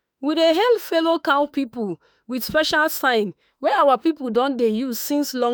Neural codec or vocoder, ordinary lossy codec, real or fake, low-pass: autoencoder, 48 kHz, 32 numbers a frame, DAC-VAE, trained on Japanese speech; none; fake; none